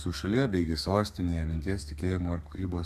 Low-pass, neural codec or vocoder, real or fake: 14.4 kHz; codec, 32 kHz, 1.9 kbps, SNAC; fake